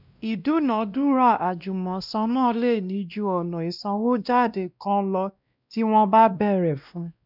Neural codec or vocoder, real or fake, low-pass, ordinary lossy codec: codec, 16 kHz, 1 kbps, X-Codec, WavLM features, trained on Multilingual LibriSpeech; fake; 5.4 kHz; none